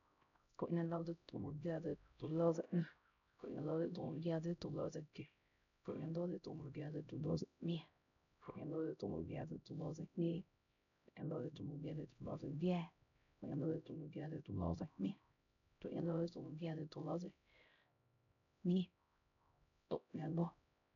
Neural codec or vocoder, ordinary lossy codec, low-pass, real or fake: codec, 16 kHz, 0.5 kbps, X-Codec, HuBERT features, trained on LibriSpeech; none; 7.2 kHz; fake